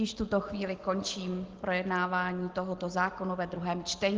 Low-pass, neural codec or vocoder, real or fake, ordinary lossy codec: 7.2 kHz; none; real; Opus, 16 kbps